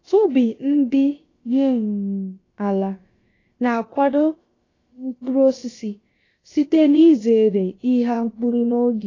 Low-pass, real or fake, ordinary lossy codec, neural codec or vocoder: 7.2 kHz; fake; AAC, 32 kbps; codec, 16 kHz, about 1 kbps, DyCAST, with the encoder's durations